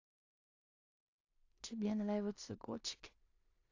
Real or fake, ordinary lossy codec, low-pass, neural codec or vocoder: fake; AAC, 48 kbps; 7.2 kHz; codec, 16 kHz in and 24 kHz out, 0.4 kbps, LongCat-Audio-Codec, two codebook decoder